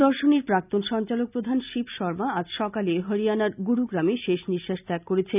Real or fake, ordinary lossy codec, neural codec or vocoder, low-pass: real; none; none; 3.6 kHz